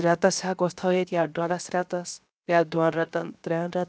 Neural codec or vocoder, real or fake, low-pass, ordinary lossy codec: codec, 16 kHz, 0.7 kbps, FocalCodec; fake; none; none